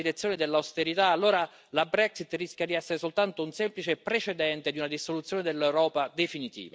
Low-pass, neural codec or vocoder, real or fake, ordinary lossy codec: none; none; real; none